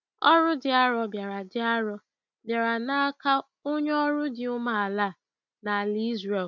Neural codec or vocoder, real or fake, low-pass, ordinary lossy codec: none; real; 7.2 kHz; none